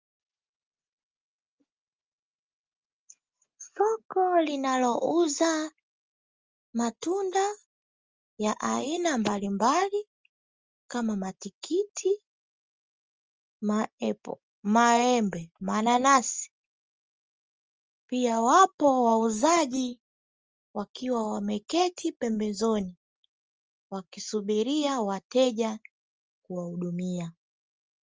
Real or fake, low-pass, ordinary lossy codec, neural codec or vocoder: real; 7.2 kHz; Opus, 24 kbps; none